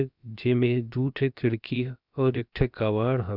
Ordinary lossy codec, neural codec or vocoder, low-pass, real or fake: none; codec, 16 kHz, about 1 kbps, DyCAST, with the encoder's durations; 5.4 kHz; fake